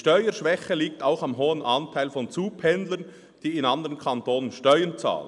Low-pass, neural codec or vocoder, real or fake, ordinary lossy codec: 10.8 kHz; none; real; none